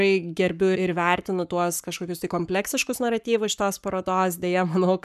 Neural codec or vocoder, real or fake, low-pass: codec, 44.1 kHz, 7.8 kbps, Pupu-Codec; fake; 14.4 kHz